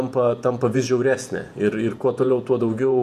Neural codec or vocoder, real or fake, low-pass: vocoder, 48 kHz, 128 mel bands, Vocos; fake; 14.4 kHz